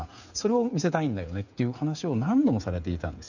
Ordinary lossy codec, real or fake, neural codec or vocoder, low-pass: none; fake; codec, 16 kHz, 8 kbps, FreqCodec, smaller model; 7.2 kHz